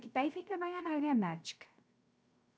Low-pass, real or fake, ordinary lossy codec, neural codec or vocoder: none; fake; none; codec, 16 kHz, 0.3 kbps, FocalCodec